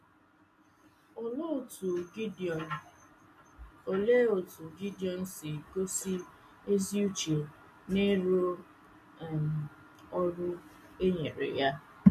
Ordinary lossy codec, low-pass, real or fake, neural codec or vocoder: MP3, 64 kbps; 14.4 kHz; real; none